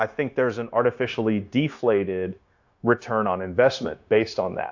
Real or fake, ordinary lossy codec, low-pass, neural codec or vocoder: fake; AAC, 48 kbps; 7.2 kHz; codec, 16 kHz, 0.9 kbps, LongCat-Audio-Codec